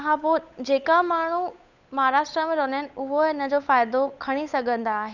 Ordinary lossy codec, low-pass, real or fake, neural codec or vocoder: none; 7.2 kHz; fake; codec, 16 kHz, 8 kbps, FunCodec, trained on Chinese and English, 25 frames a second